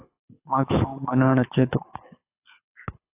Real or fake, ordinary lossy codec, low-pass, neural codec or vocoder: fake; AAC, 24 kbps; 3.6 kHz; codec, 24 kHz, 6 kbps, HILCodec